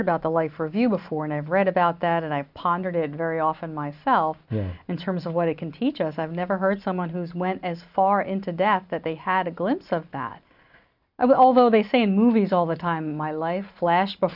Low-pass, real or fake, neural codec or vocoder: 5.4 kHz; real; none